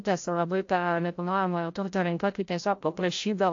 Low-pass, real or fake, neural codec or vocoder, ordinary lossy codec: 7.2 kHz; fake; codec, 16 kHz, 0.5 kbps, FreqCodec, larger model; AAC, 48 kbps